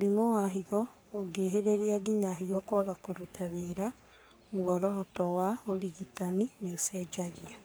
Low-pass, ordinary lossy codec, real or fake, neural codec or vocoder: none; none; fake; codec, 44.1 kHz, 3.4 kbps, Pupu-Codec